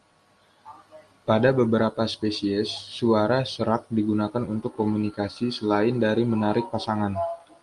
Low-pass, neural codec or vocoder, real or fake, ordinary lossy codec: 10.8 kHz; none; real; Opus, 32 kbps